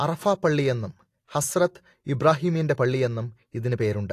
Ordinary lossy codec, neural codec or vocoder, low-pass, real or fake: AAC, 48 kbps; none; 14.4 kHz; real